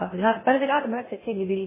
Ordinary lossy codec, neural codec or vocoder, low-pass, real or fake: MP3, 16 kbps; codec, 16 kHz in and 24 kHz out, 0.6 kbps, FocalCodec, streaming, 2048 codes; 3.6 kHz; fake